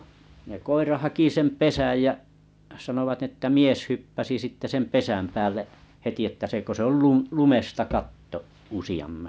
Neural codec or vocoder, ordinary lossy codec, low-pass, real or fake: none; none; none; real